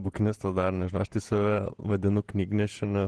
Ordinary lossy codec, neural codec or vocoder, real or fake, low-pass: Opus, 16 kbps; vocoder, 48 kHz, 128 mel bands, Vocos; fake; 10.8 kHz